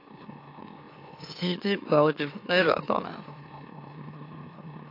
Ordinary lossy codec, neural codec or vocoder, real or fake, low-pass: AAC, 32 kbps; autoencoder, 44.1 kHz, a latent of 192 numbers a frame, MeloTTS; fake; 5.4 kHz